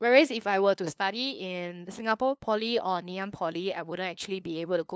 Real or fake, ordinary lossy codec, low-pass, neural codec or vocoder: fake; none; none; codec, 16 kHz, 2 kbps, FunCodec, trained on LibriTTS, 25 frames a second